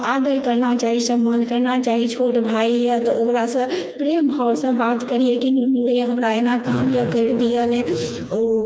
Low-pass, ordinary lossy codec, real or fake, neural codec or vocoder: none; none; fake; codec, 16 kHz, 2 kbps, FreqCodec, smaller model